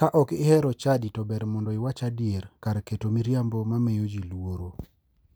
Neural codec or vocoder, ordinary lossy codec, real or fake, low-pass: none; none; real; none